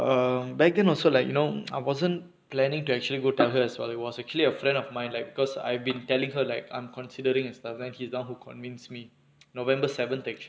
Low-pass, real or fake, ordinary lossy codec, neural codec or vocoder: none; real; none; none